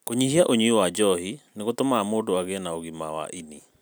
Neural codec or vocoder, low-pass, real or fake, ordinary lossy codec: none; none; real; none